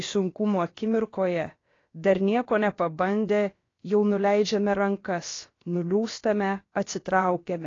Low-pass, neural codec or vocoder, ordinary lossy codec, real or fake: 7.2 kHz; codec, 16 kHz, about 1 kbps, DyCAST, with the encoder's durations; AAC, 32 kbps; fake